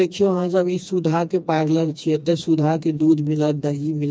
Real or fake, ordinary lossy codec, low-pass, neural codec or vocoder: fake; none; none; codec, 16 kHz, 2 kbps, FreqCodec, smaller model